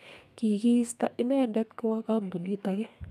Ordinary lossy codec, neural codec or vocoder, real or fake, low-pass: none; codec, 32 kHz, 1.9 kbps, SNAC; fake; 14.4 kHz